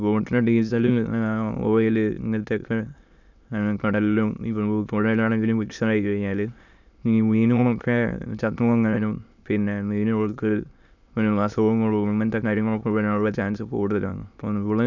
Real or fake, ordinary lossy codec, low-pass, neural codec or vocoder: fake; none; 7.2 kHz; autoencoder, 22.05 kHz, a latent of 192 numbers a frame, VITS, trained on many speakers